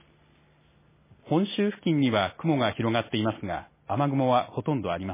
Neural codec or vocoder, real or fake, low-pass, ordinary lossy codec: none; real; 3.6 kHz; MP3, 16 kbps